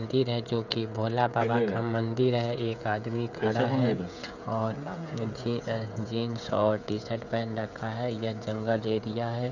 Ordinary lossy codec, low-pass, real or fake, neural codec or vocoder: none; 7.2 kHz; fake; codec, 16 kHz, 16 kbps, FreqCodec, smaller model